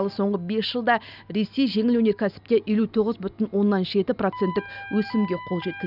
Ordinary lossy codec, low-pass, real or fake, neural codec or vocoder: none; 5.4 kHz; real; none